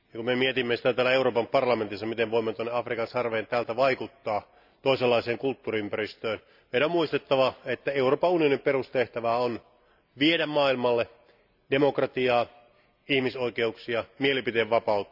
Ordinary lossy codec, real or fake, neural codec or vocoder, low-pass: none; real; none; 5.4 kHz